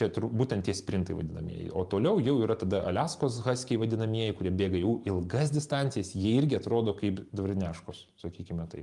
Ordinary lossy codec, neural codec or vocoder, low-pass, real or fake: Opus, 32 kbps; vocoder, 44.1 kHz, 128 mel bands every 512 samples, BigVGAN v2; 10.8 kHz; fake